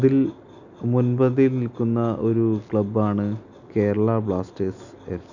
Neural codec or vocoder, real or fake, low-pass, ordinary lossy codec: none; real; 7.2 kHz; none